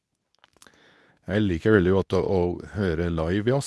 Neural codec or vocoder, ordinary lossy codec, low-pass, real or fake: codec, 24 kHz, 0.9 kbps, WavTokenizer, medium speech release version 2; none; none; fake